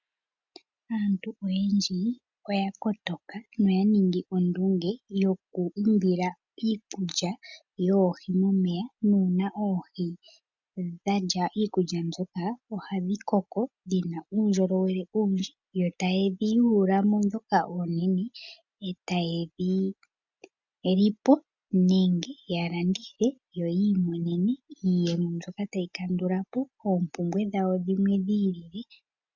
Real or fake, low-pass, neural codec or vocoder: real; 7.2 kHz; none